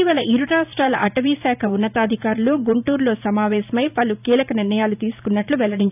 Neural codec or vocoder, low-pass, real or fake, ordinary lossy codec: vocoder, 44.1 kHz, 128 mel bands every 512 samples, BigVGAN v2; 3.6 kHz; fake; none